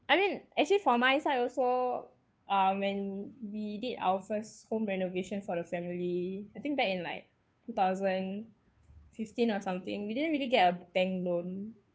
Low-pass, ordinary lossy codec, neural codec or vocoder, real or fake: none; none; codec, 16 kHz, 2 kbps, FunCodec, trained on Chinese and English, 25 frames a second; fake